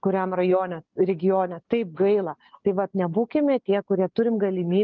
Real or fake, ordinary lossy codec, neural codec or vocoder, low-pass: real; Opus, 24 kbps; none; 7.2 kHz